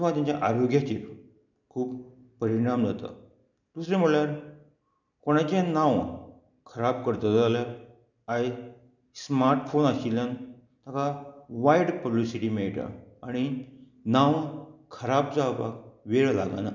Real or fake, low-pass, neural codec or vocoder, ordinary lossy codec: real; 7.2 kHz; none; none